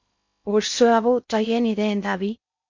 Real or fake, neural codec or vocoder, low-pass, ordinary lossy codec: fake; codec, 16 kHz in and 24 kHz out, 0.6 kbps, FocalCodec, streaming, 2048 codes; 7.2 kHz; MP3, 48 kbps